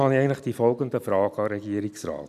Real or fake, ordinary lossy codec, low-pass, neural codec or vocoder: real; none; 14.4 kHz; none